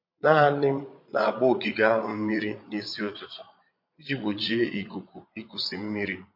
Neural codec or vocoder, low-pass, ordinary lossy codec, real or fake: vocoder, 22.05 kHz, 80 mel bands, Vocos; 5.4 kHz; MP3, 32 kbps; fake